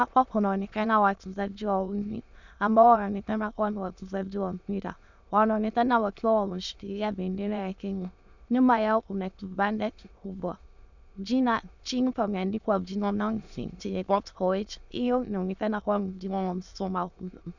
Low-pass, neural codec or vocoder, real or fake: 7.2 kHz; autoencoder, 22.05 kHz, a latent of 192 numbers a frame, VITS, trained on many speakers; fake